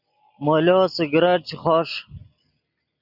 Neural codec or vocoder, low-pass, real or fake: none; 5.4 kHz; real